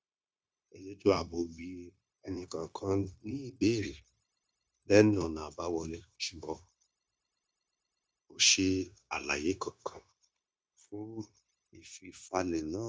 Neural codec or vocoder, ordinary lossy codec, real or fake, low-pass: codec, 16 kHz, 0.9 kbps, LongCat-Audio-Codec; none; fake; none